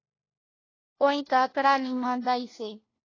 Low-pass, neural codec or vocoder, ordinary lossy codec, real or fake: 7.2 kHz; codec, 16 kHz, 1 kbps, FunCodec, trained on LibriTTS, 50 frames a second; AAC, 32 kbps; fake